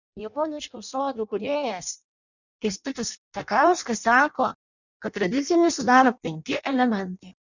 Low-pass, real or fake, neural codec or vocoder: 7.2 kHz; fake; codec, 16 kHz in and 24 kHz out, 0.6 kbps, FireRedTTS-2 codec